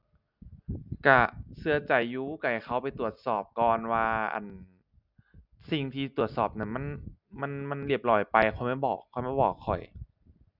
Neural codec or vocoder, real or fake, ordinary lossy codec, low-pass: none; real; none; 5.4 kHz